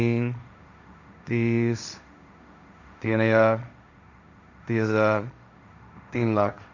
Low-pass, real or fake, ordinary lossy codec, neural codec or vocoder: 7.2 kHz; fake; none; codec, 16 kHz, 1.1 kbps, Voila-Tokenizer